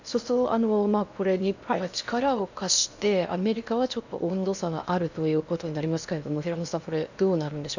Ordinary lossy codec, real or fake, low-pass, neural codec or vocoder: none; fake; 7.2 kHz; codec, 16 kHz in and 24 kHz out, 0.8 kbps, FocalCodec, streaming, 65536 codes